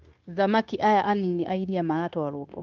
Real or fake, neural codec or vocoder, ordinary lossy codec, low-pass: fake; codec, 16 kHz, 0.9 kbps, LongCat-Audio-Codec; Opus, 24 kbps; 7.2 kHz